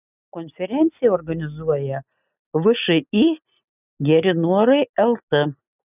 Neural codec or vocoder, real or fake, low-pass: none; real; 3.6 kHz